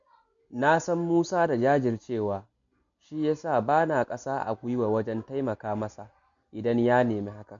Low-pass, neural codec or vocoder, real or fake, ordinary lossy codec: 7.2 kHz; none; real; none